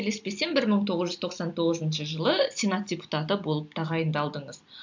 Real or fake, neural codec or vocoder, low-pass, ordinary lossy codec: real; none; 7.2 kHz; MP3, 64 kbps